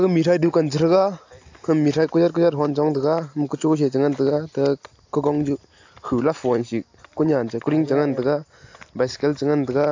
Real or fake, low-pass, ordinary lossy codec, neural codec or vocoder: real; 7.2 kHz; AAC, 48 kbps; none